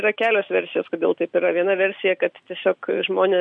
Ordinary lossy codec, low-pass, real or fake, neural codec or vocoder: AAC, 64 kbps; 9.9 kHz; real; none